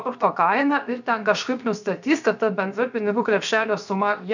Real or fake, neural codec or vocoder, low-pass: fake; codec, 16 kHz, 0.7 kbps, FocalCodec; 7.2 kHz